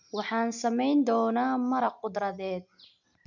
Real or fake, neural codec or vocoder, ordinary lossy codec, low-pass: real; none; none; 7.2 kHz